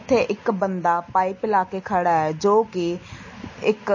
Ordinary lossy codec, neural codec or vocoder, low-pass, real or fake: MP3, 32 kbps; none; 7.2 kHz; real